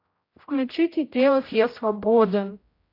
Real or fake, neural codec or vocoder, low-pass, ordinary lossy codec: fake; codec, 16 kHz, 0.5 kbps, X-Codec, HuBERT features, trained on general audio; 5.4 kHz; AAC, 32 kbps